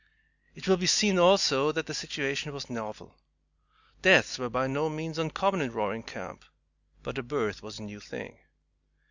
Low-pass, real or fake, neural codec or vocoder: 7.2 kHz; real; none